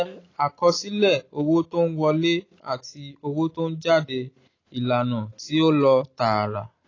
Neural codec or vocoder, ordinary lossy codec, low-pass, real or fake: none; AAC, 32 kbps; 7.2 kHz; real